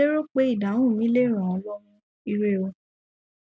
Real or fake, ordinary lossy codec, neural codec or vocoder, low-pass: real; none; none; none